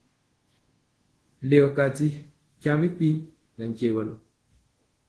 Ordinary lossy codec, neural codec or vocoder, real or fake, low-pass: Opus, 16 kbps; codec, 24 kHz, 0.5 kbps, DualCodec; fake; 10.8 kHz